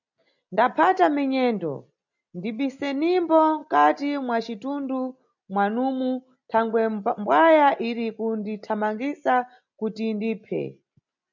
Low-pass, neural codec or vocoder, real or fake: 7.2 kHz; none; real